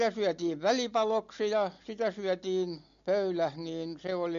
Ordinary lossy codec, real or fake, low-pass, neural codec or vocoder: MP3, 48 kbps; real; 7.2 kHz; none